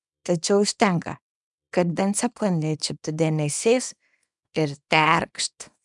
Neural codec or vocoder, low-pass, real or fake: codec, 24 kHz, 0.9 kbps, WavTokenizer, small release; 10.8 kHz; fake